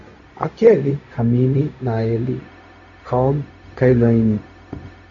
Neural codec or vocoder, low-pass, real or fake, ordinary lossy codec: codec, 16 kHz, 0.4 kbps, LongCat-Audio-Codec; 7.2 kHz; fake; AAC, 64 kbps